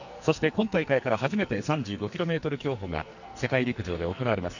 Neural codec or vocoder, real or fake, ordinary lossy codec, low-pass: codec, 44.1 kHz, 2.6 kbps, SNAC; fake; none; 7.2 kHz